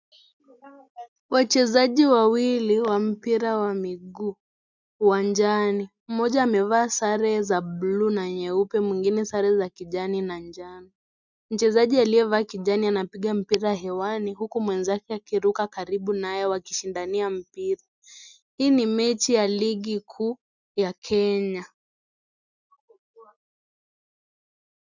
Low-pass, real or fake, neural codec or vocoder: 7.2 kHz; real; none